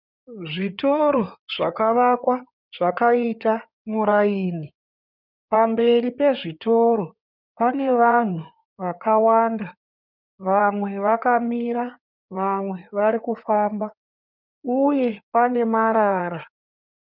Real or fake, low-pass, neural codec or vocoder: fake; 5.4 kHz; codec, 16 kHz in and 24 kHz out, 2.2 kbps, FireRedTTS-2 codec